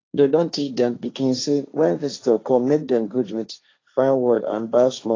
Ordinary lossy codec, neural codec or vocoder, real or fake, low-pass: AAC, 32 kbps; codec, 16 kHz, 1.1 kbps, Voila-Tokenizer; fake; 7.2 kHz